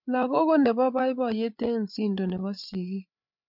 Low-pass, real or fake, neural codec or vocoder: 5.4 kHz; fake; codec, 16 kHz, 8 kbps, FreqCodec, larger model